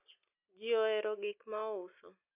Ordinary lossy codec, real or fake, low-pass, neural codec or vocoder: none; real; 3.6 kHz; none